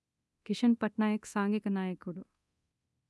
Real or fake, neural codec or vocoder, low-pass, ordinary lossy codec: fake; codec, 24 kHz, 0.9 kbps, DualCodec; none; none